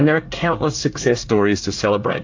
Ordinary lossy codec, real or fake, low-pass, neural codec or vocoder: AAC, 48 kbps; fake; 7.2 kHz; codec, 24 kHz, 1 kbps, SNAC